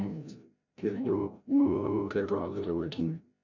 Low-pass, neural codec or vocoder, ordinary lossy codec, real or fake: 7.2 kHz; codec, 16 kHz, 0.5 kbps, FreqCodec, larger model; none; fake